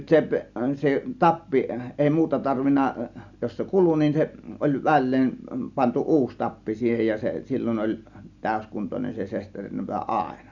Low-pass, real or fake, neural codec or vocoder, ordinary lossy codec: 7.2 kHz; real; none; none